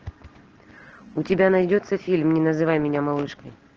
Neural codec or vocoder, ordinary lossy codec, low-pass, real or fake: none; Opus, 16 kbps; 7.2 kHz; real